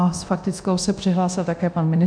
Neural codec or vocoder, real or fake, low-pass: codec, 24 kHz, 0.9 kbps, DualCodec; fake; 9.9 kHz